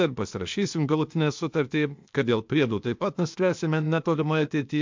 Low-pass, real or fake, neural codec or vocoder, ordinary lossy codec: 7.2 kHz; fake; codec, 16 kHz, 0.8 kbps, ZipCodec; MP3, 64 kbps